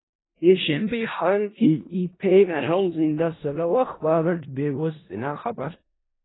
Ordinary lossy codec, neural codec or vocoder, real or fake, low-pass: AAC, 16 kbps; codec, 16 kHz in and 24 kHz out, 0.4 kbps, LongCat-Audio-Codec, four codebook decoder; fake; 7.2 kHz